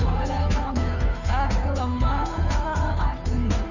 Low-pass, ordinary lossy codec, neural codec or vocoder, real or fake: 7.2 kHz; none; codec, 16 kHz, 2 kbps, FunCodec, trained on Chinese and English, 25 frames a second; fake